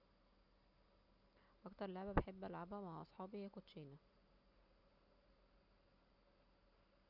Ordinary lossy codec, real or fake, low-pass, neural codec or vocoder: none; real; 5.4 kHz; none